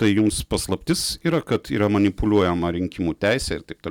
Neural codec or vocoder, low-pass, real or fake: none; 19.8 kHz; real